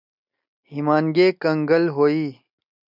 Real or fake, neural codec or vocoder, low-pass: real; none; 5.4 kHz